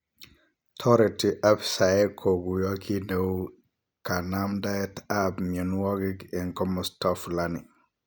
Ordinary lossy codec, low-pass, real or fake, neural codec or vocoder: none; none; real; none